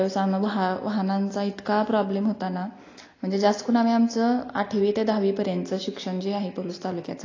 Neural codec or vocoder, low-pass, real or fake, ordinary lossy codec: none; 7.2 kHz; real; AAC, 32 kbps